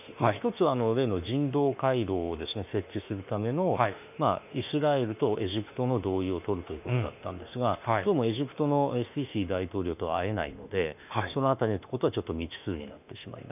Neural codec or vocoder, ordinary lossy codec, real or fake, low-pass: autoencoder, 48 kHz, 32 numbers a frame, DAC-VAE, trained on Japanese speech; none; fake; 3.6 kHz